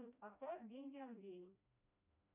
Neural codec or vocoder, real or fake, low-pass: codec, 16 kHz, 1 kbps, FreqCodec, smaller model; fake; 3.6 kHz